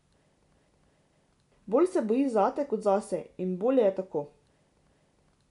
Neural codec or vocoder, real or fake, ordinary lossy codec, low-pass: none; real; none; 10.8 kHz